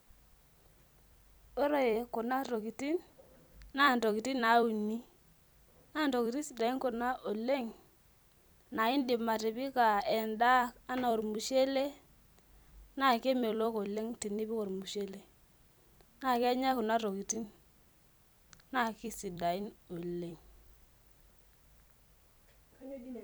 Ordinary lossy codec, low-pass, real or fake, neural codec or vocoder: none; none; fake; vocoder, 44.1 kHz, 128 mel bands every 256 samples, BigVGAN v2